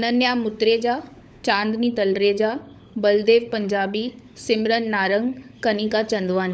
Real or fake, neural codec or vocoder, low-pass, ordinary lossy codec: fake; codec, 16 kHz, 16 kbps, FunCodec, trained on LibriTTS, 50 frames a second; none; none